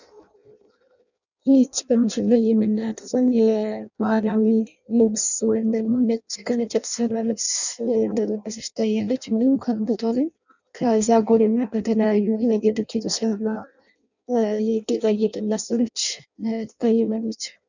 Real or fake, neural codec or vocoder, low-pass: fake; codec, 16 kHz in and 24 kHz out, 0.6 kbps, FireRedTTS-2 codec; 7.2 kHz